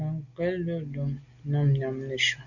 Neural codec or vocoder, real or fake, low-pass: none; real; 7.2 kHz